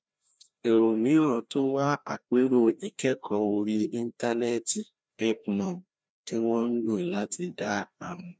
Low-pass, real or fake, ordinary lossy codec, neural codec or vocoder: none; fake; none; codec, 16 kHz, 1 kbps, FreqCodec, larger model